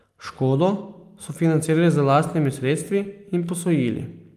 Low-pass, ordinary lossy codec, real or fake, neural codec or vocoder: 14.4 kHz; Opus, 32 kbps; real; none